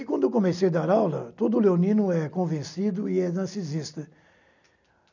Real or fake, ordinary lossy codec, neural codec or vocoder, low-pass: real; none; none; 7.2 kHz